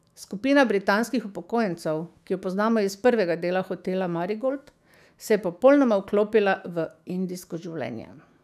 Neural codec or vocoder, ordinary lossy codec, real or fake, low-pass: autoencoder, 48 kHz, 128 numbers a frame, DAC-VAE, trained on Japanese speech; none; fake; 14.4 kHz